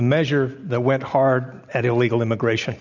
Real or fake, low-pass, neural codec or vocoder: real; 7.2 kHz; none